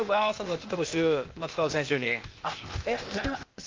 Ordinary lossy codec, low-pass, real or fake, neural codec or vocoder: Opus, 24 kbps; 7.2 kHz; fake; codec, 16 kHz, 0.8 kbps, ZipCodec